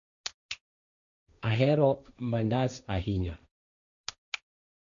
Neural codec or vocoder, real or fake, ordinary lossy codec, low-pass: codec, 16 kHz, 1.1 kbps, Voila-Tokenizer; fake; none; 7.2 kHz